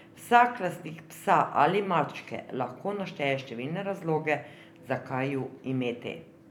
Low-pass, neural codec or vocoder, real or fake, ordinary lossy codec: 19.8 kHz; vocoder, 44.1 kHz, 128 mel bands every 256 samples, BigVGAN v2; fake; none